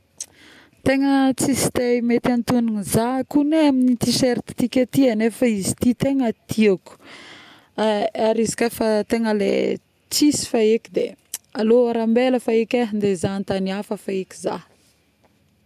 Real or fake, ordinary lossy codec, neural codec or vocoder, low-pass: real; none; none; 14.4 kHz